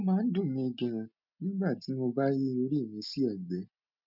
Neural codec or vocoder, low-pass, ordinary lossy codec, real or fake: none; 5.4 kHz; none; real